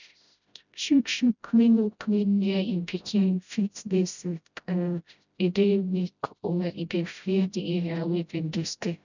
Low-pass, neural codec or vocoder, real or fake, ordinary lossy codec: 7.2 kHz; codec, 16 kHz, 0.5 kbps, FreqCodec, smaller model; fake; none